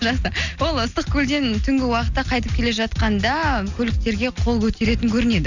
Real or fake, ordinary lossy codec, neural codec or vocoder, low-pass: real; none; none; 7.2 kHz